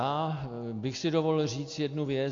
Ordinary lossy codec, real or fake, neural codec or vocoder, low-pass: AAC, 48 kbps; real; none; 7.2 kHz